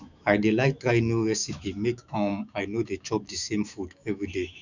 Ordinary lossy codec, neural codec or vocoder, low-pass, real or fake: none; autoencoder, 48 kHz, 128 numbers a frame, DAC-VAE, trained on Japanese speech; 7.2 kHz; fake